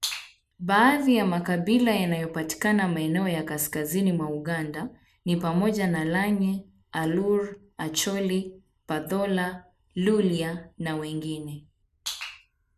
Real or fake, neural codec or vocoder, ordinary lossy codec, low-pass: real; none; none; 14.4 kHz